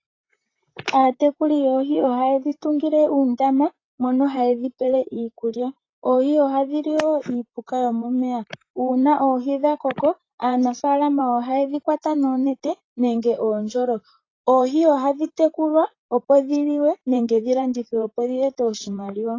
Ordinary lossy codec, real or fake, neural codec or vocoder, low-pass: AAC, 32 kbps; fake; vocoder, 44.1 kHz, 128 mel bands, Pupu-Vocoder; 7.2 kHz